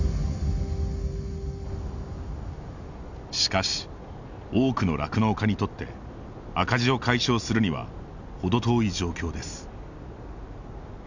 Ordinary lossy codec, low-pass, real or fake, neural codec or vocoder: none; 7.2 kHz; real; none